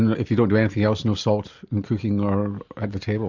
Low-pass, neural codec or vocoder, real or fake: 7.2 kHz; none; real